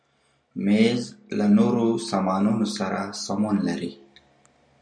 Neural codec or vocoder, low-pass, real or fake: none; 9.9 kHz; real